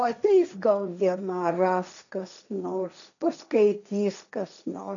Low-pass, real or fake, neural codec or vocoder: 7.2 kHz; fake; codec, 16 kHz, 1.1 kbps, Voila-Tokenizer